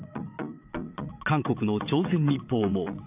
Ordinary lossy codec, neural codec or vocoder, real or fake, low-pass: none; vocoder, 22.05 kHz, 80 mel bands, WaveNeXt; fake; 3.6 kHz